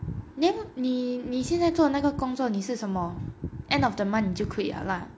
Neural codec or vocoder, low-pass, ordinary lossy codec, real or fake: none; none; none; real